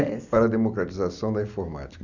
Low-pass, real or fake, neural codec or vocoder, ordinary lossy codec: 7.2 kHz; real; none; none